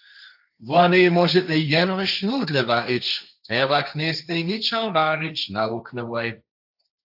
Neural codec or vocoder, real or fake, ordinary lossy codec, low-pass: codec, 16 kHz, 1.1 kbps, Voila-Tokenizer; fake; AAC, 48 kbps; 5.4 kHz